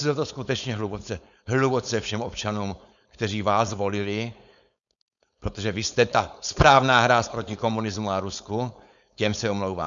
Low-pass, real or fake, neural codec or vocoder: 7.2 kHz; fake; codec, 16 kHz, 4.8 kbps, FACodec